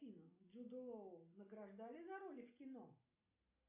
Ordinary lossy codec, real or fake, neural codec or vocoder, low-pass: AAC, 32 kbps; real; none; 3.6 kHz